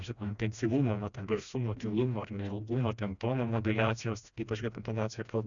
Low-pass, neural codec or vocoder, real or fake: 7.2 kHz; codec, 16 kHz, 1 kbps, FreqCodec, smaller model; fake